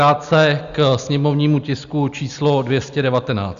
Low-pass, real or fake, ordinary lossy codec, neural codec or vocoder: 7.2 kHz; real; Opus, 64 kbps; none